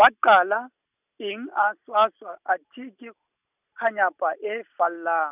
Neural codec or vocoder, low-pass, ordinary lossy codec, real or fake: none; 3.6 kHz; none; real